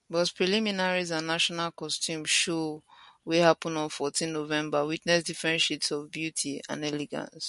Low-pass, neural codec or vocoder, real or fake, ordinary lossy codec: 14.4 kHz; none; real; MP3, 48 kbps